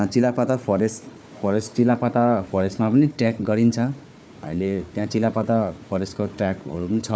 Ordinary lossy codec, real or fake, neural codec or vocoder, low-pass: none; fake; codec, 16 kHz, 4 kbps, FunCodec, trained on Chinese and English, 50 frames a second; none